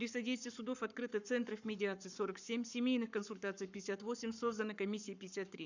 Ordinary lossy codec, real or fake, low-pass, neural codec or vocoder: none; fake; 7.2 kHz; codec, 44.1 kHz, 7.8 kbps, Pupu-Codec